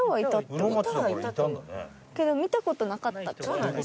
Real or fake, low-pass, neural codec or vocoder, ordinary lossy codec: real; none; none; none